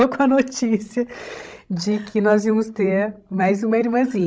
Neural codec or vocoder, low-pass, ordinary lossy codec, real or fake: codec, 16 kHz, 16 kbps, FreqCodec, larger model; none; none; fake